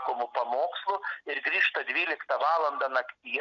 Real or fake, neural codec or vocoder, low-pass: real; none; 7.2 kHz